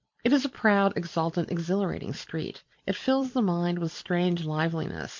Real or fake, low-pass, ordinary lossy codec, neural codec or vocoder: fake; 7.2 kHz; MP3, 48 kbps; vocoder, 44.1 kHz, 128 mel bands every 256 samples, BigVGAN v2